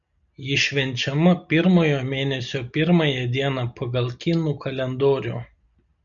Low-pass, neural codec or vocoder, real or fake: 7.2 kHz; none; real